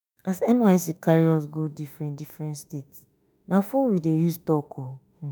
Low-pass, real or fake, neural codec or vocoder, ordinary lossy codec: none; fake; autoencoder, 48 kHz, 32 numbers a frame, DAC-VAE, trained on Japanese speech; none